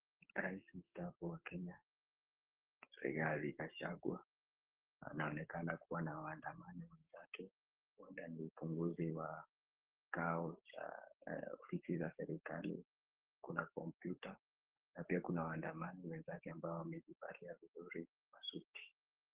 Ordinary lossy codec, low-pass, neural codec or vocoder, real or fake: Opus, 16 kbps; 3.6 kHz; codec, 44.1 kHz, 7.8 kbps, Pupu-Codec; fake